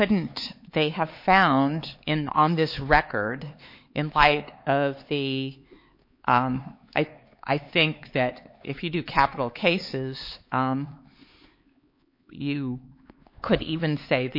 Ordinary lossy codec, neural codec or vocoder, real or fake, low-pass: MP3, 32 kbps; codec, 16 kHz, 4 kbps, X-Codec, HuBERT features, trained on LibriSpeech; fake; 5.4 kHz